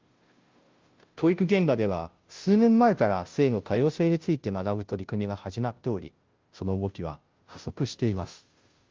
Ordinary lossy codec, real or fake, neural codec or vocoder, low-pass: Opus, 32 kbps; fake; codec, 16 kHz, 0.5 kbps, FunCodec, trained on Chinese and English, 25 frames a second; 7.2 kHz